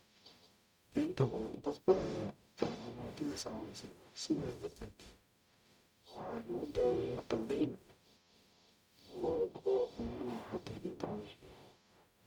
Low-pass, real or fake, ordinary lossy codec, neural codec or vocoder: 19.8 kHz; fake; none; codec, 44.1 kHz, 0.9 kbps, DAC